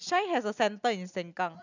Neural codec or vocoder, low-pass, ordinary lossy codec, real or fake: none; 7.2 kHz; none; real